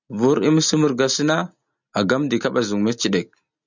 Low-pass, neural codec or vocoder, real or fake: 7.2 kHz; none; real